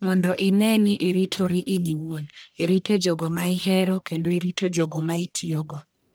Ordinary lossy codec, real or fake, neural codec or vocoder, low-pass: none; fake; codec, 44.1 kHz, 1.7 kbps, Pupu-Codec; none